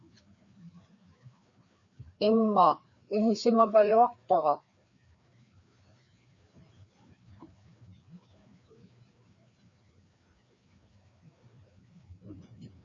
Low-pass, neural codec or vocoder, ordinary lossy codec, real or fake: 7.2 kHz; codec, 16 kHz, 2 kbps, FreqCodec, larger model; MP3, 48 kbps; fake